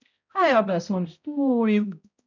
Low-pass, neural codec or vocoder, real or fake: 7.2 kHz; codec, 16 kHz, 0.5 kbps, X-Codec, HuBERT features, trained on balanced general audio; fake